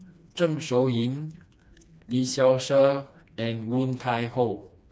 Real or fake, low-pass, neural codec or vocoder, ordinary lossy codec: fake; none; codec, 16 kHz, 2 kbps, FreqCodec, smaller model; none